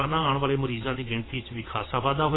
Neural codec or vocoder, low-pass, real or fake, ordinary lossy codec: vocoder, 22.05 kHz, 80 mel bands, WaveNeXt; 7.2 kHz; fake; AAC, 16 kbps